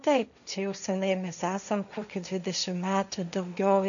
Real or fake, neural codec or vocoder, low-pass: fake; codec, 16 kHz, 1.1 kbps, Voila-Tokenizer; 7.2 kHz